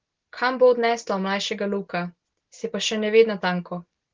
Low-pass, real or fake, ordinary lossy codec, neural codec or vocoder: 7.2 kHz; real; Opus, 16 kbps; none